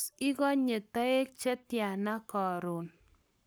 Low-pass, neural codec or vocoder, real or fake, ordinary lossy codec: none; codec, 44.1 kHz, 7.8 kbps, Pupu-Codec; fake; none